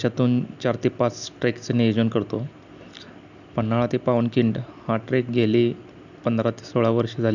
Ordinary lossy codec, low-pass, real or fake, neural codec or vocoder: none; 7.2 kHz; real; none